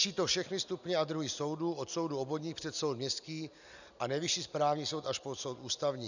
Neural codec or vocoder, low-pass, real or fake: none; 7.2 kHz; real